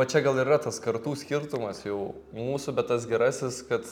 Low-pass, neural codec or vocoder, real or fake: 19.8 kHz; none; real